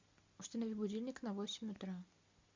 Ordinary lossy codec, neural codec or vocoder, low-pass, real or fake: MP3, 64 kbps; none; 7.2 kHz; real